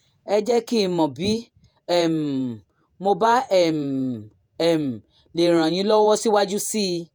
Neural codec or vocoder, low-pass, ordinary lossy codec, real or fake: vocoder, 48 kHz, 128 mel bands, Vocos; none; none; fake